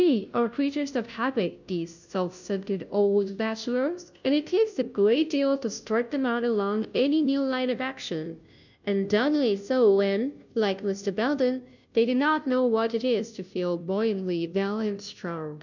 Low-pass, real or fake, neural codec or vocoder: 7.2 kHz; fake; codec, 16 kHz, 0.5 kbps, FunCodec, trained on Chinese and English, 25 frames a second